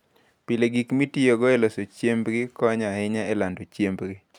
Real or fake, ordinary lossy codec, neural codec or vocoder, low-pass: real; none; none; 19.8 kHz